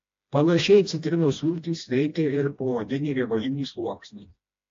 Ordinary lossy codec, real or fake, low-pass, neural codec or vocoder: AAC, 48 kbps; fake; 7.2 kHz; codec, 16 kHz, 1 kbps, FreqCodec, smaller model